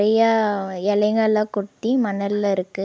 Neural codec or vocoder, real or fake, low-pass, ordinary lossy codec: none; real; none; none